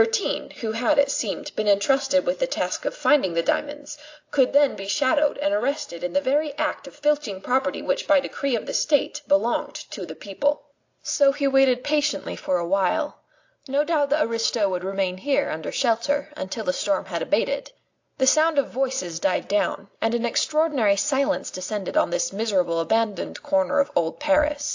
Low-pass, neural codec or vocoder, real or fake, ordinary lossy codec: 7.2 kHz; none; real; AAC, 48 kbps